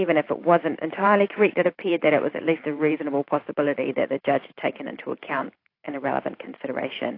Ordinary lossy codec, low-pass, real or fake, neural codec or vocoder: AAC, 32 kbps; 5.4 kHz; fake; vocoder, 44.1 kHz, 128 mel bands every 512 samples, BigVGAN v2